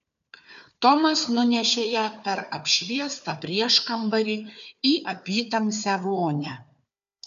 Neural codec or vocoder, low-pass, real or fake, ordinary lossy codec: codec, 16 kHz, 4 kbps, FunCodec, trained on Chinese and English, 50 frames a second; 7.2 kHz; fake; AAC, 96 kbps